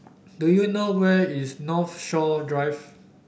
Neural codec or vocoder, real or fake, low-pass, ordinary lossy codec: none; real; none; none